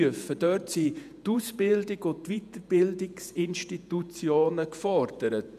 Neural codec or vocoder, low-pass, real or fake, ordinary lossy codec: vocoder, 48 kHz, 128 mel bands, Vocos; 14.4 kHz; fake; none